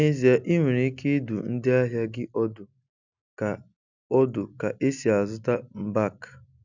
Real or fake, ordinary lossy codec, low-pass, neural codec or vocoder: real; none; 7.2 kHz; none